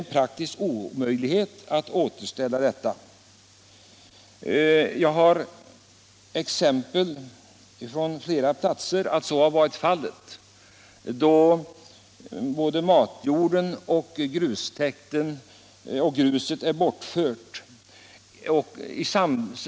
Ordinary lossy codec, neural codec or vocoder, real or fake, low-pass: none; none; real; none